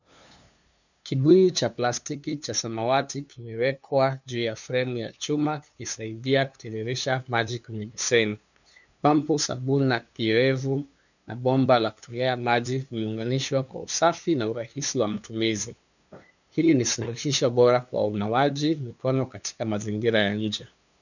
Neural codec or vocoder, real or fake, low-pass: codec, 16 kHz, 2 kbps, FunCodec, trained on LibriTTS, 25 frames a second; fake; 7.2 kHz